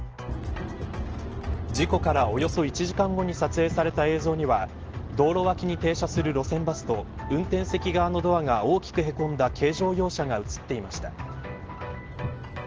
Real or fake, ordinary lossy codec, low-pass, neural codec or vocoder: real; Opus, 16 kbps; 7.2 kHz; none